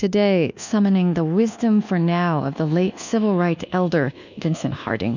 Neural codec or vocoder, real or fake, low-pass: codec, 24 kHz, 1.2 kbps, DualCodec; fake; 7.2 kHz